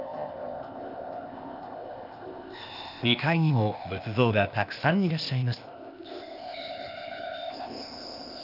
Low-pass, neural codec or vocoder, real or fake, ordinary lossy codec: 5.4 kHz; codec, 16 kHz, 0.8 kbps, ZipCodec; fake; none